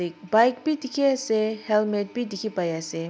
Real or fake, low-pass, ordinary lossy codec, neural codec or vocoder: real; none; none; none